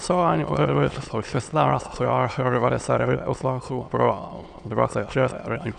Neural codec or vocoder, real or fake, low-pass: autoencoder, 22.05 kHz, a latent of 192 numbers a frame, VITS, trained on many speakers; fake; 9.9 kHz